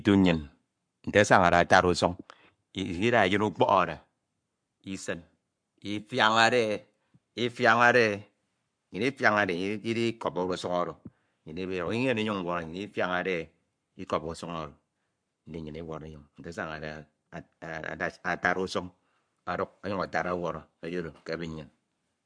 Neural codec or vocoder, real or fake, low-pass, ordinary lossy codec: codec, 44.1 kHz, 7.8 kbps, Pupu-Codec; fake; 9.9 kHz; MP3, 64 kbps